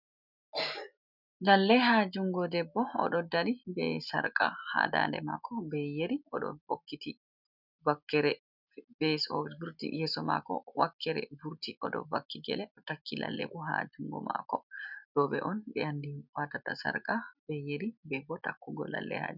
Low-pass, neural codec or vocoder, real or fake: 5.4 kHz; none; real